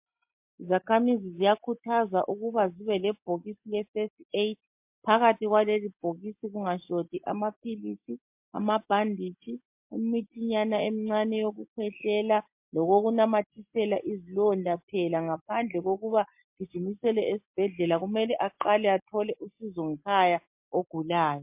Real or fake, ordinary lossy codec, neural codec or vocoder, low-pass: real; MP3, 32 kbps; none; 3.6 kHz